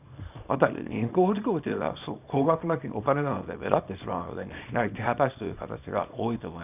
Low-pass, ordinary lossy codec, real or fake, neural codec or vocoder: 3.6 kHz; none; fake; codec, 24 kHz, 0.9 kbps, WavTokenizer, small release